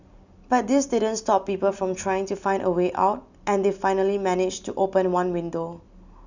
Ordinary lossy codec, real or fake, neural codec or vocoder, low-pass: none; real; none; 7.2 kHz